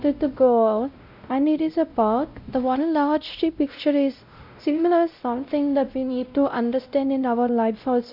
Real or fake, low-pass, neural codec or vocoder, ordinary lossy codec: fake; 5.4 kHz; codec, 16 kHz, 0.5 kbps, X-Codec, WavLM features, trained on Multilingual LibriSpeech; none